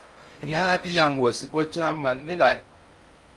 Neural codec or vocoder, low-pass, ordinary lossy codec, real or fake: codec, 16 kHz in and 24 kHz out, 0.6 kbps, FocalCodec, streaming, 4096 codes; 10.8 kHz; Opus, 32 kbps; fake